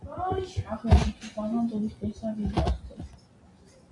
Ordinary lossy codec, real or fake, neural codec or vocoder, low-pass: AAC, 48 kbps; real; none; 10.8 kHz